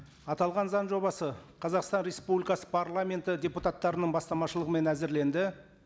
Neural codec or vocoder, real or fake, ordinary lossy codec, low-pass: none; real; none; none